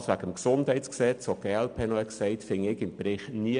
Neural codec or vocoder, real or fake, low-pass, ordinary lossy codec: none; real; 9.9 kHz; none